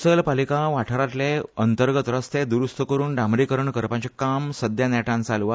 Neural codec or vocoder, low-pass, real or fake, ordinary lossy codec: none; none; real; none